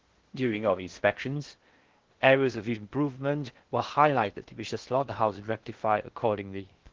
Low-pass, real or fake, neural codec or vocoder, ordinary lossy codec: 7.2 kHz; fake; codec, 16 kHz in and 24 kHz out, 0.6 kbps, FocalCodec, streaming, 4096 codes; Opus, 16 kbps